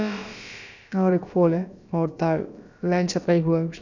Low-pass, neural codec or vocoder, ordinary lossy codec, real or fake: 7.2 kHz; codec, 16 kHz, about 1 kbps, DyCAST, with the encoder's durations; none; fake